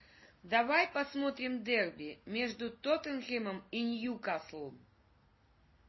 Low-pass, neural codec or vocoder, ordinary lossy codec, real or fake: 7.2 kHz; none; MP3, 24 kbps; real